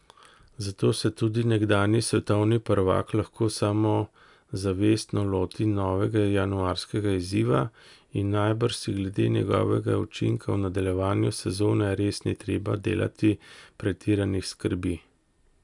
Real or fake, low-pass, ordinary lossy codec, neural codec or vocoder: real; 10.8 kHz; none; none